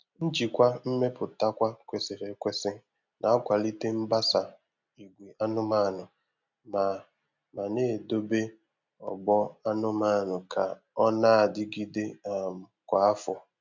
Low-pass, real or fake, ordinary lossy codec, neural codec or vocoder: 7.2 kHz; real; MP3, 64 kbps; none